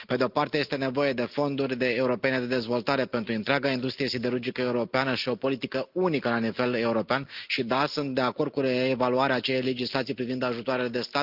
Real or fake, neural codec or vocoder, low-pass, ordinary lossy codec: real; none; 5.4 kHz; Opus, 24 kbps